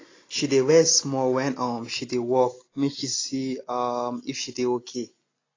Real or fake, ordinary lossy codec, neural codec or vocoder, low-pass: real; AAC, 32 kbps; none; 7.2 kHz